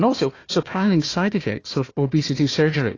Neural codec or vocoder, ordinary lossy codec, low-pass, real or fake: codec, 16 kHz, 1 kbps, FunCodec, trained on Chinese and English, 50 frames a second; AAC, 32 kbps; 7.2 kHz; fake